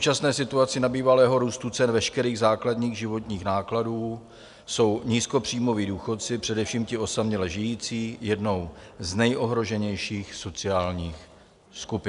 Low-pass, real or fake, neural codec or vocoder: 10.8 kHz; real; none